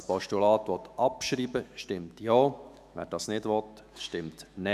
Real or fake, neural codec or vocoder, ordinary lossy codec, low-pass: fake; autoencoder, 48 kHz, 128 numbers a frame, DAC-VAE, trained on Japanese speech; none; 14.4 kHz